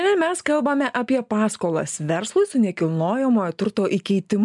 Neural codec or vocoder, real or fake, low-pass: none; real; 10.8 kHz